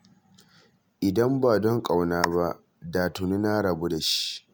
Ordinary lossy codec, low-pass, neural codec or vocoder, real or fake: none; none; none; real